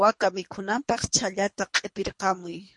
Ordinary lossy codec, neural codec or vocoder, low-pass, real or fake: MP3, 48 kbps; codec, 24 kHz, 3 kbps, HILCodec; 10.8 kHz; fake